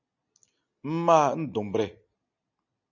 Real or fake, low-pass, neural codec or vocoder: real; 7.2 kHz; none